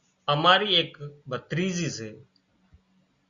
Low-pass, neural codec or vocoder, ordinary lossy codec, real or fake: 7.2 kHz; none; Opus, 64 kbps; real